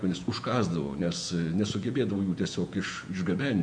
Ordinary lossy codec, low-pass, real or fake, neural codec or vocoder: MP3, 64 kbps; 9.9 kHz; fake; vocoder, 48 kHz, 128 mel bands, Vocos